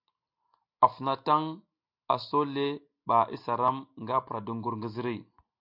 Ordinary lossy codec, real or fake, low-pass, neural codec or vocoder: MP3, 48 kbps; real; 5.4 kHz; none